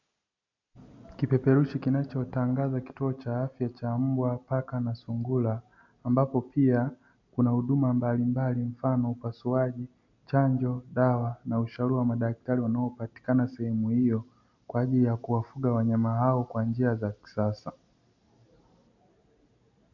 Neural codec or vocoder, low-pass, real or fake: none; 7.2 kHz; real